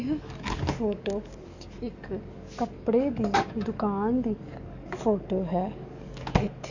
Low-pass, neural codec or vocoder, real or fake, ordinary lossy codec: 7.2 kHz; codec, 44.1 kHz, 7.8 kbps, DAC; fake; none